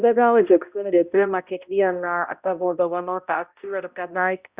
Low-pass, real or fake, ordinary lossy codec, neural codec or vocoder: 3.6 kHz; fake; Opus, 64 kbps; codec, 16 kHz, 0.5 kbps, X-Codec, HuBERT features, trained on balanced general audio